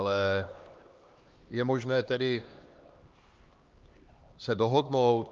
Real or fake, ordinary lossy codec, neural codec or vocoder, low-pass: fake; Opus, 24 kbps; codec, 16 kHz, 2 kbps, X-Codec, HuBERT features, trained on LibriSpeech; 7.2 kHz